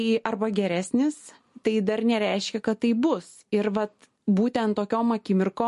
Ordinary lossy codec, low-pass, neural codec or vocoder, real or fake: MP3, 48 kbps; 14.4 kHz; none; real